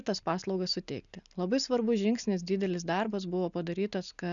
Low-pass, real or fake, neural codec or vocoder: 7.2 kHz; real; none